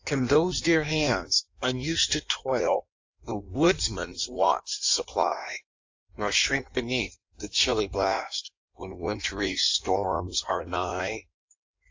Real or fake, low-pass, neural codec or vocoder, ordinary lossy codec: fake; 7.2 kHz; codec, 16 kHz in and 24 kHz out, 1.1 kbps, FireRedTTS-2 codec; AAC, 48 kbps